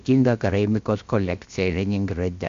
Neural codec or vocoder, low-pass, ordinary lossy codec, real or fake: codec, 16 kHz, 0.7 kbps, FocalCodec; 7.2 kHz; MP3, 48 kbps; fake